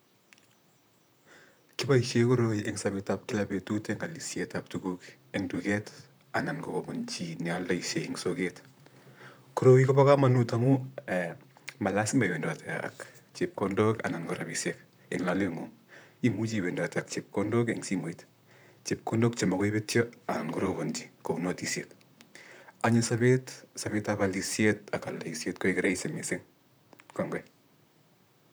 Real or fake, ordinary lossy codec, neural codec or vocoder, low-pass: fake; none; vocoder, 44.1 kHz, 128 mel bands, Pupu-Vocoder; none